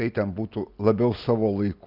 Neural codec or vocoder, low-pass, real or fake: none; 5.4 kHz; real